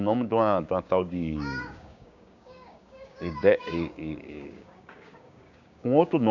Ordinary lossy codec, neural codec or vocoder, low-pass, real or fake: none; codec, 16 kHz, 6 kbps, DAC; 7.2 kHz; fake